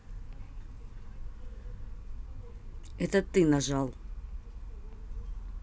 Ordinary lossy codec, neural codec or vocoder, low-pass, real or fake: none; none; none; real